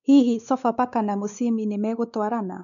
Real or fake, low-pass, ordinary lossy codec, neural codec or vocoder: fake; 7.2 kHz; none; codec, 16 kHz, 4 kbps, X-Codec, WavLM features, trained on Multilingual LibriSpeech